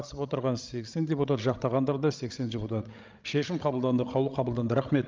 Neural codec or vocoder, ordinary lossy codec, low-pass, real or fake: codec, 16 kHz, 16 kbps, FreqCodec, larger model; Opus, 24 kbps; 7.2 kHz; fake